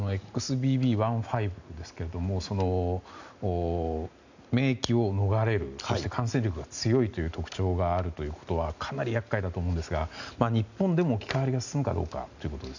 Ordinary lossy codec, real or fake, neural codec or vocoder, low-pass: none; real; none; 7.2 kHz